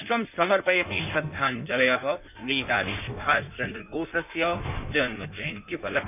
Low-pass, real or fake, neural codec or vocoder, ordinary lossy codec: 3.6 kHz; fake; codec, 16 kHz in and 24 kHz out, 1.1 kbps, FireRedTTS-2 codec; none